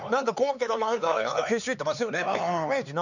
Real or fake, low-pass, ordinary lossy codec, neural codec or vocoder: fake; 7.2 kHz; none; codec, 16 kHz, 4 kbps, X-Codec, HuBERT features, trained on LibriSpeech